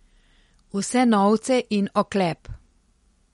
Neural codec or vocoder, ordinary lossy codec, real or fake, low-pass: none; MP3, 48 kbps; real; 19.8 kHz